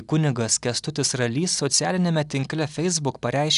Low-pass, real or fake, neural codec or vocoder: 10.8 kHz; real; none